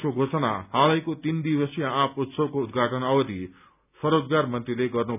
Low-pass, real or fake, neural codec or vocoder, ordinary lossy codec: 3.6 kHz; real; none; none